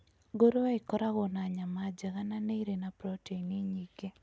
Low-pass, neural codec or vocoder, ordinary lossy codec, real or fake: none; none; none; real